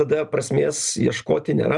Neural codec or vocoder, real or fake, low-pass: none; real; 10.8 kHz